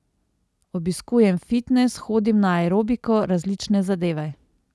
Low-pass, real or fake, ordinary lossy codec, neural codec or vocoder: none; real; none; none